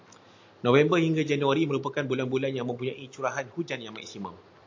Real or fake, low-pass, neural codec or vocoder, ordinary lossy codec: real; 7.2 kHz; none; AAC, 48 kbps